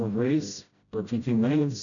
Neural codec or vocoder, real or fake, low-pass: codec, 16 kHz, 0.5 kbps, FreqCodec, smaller model; fake; 7.2 kHz